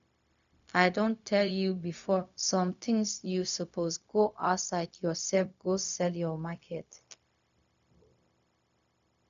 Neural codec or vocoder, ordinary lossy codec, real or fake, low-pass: codec, 16 kHz, 0.4 kbps, LongCat-Audio-Codec; none; fake; 7.2 kHz